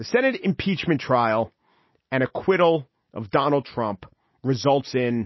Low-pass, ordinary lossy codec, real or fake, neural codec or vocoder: 7.2 kHz; MP3, 24 kbps; real; none